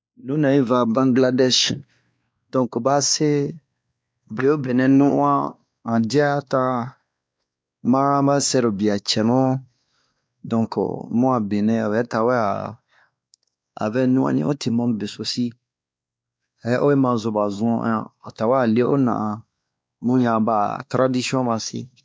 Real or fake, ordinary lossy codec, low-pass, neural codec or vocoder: fake; none; none; codec, 16 kHz, 2 kbps, X-Codec, WavLM features, trained on Multilingual LibriSpeech